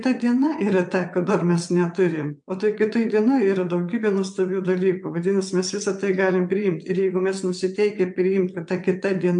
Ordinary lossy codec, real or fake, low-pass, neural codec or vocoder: MP3, 64 kbps; fake; 9.9 kHz; vocoder, 22.05 kHz, 80 mel bands, WaveNeXt